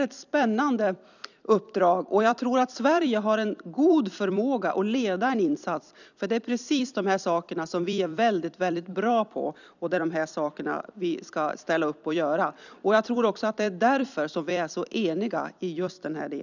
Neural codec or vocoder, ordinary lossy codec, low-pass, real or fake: vocoder, 44.1 kHz, 128 mel bands every 256 samples, BigVGAN v2; none; 7.2 kHz; fake